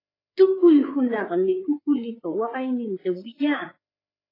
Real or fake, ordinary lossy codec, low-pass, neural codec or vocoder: fake; AAC, 24 kbps; 5.4 kHz; codec, 16 kHz, 4 kbps, FreqCodec, larger model